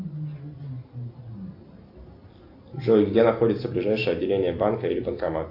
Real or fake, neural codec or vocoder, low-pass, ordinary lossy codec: real; none; 5.4 kHz; AAC, 32 kbps